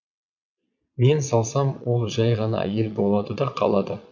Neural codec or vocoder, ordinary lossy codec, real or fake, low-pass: vocoder, 22.05 kHz, 80 mel bands, Vocos; none; fake; 7.2 kHz